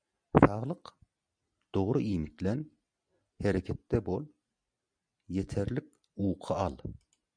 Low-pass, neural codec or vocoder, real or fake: 9.9 kHz; none; real